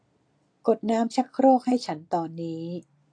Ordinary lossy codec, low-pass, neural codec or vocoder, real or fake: AAC, 48 kbps; 9.9 kHz; none; real